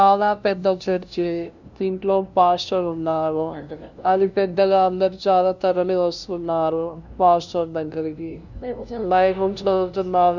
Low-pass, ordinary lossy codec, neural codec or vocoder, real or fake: 7.2 kHz; none; codec, 16 kHz, 0.5 kbps, FunCodec, trained on LibriTTS, 25 frames a second; fake